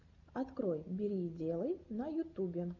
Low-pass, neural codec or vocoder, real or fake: 7.2 kHz; none; real